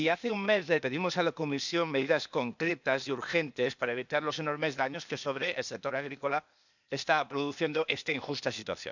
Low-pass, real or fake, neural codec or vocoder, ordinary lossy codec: 7.2 kHz; fake; codec, 16 kHz, 0.8 kbps, ZipCodec; none